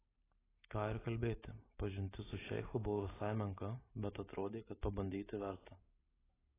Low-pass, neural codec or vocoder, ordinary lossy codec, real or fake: 3.6 kHz; none; AAC, 16 kbps; real